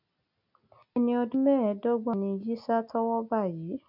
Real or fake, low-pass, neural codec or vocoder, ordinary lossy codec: real; 5.4 kHz; none; none